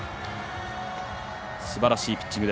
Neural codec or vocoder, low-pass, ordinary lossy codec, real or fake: none; none; none; real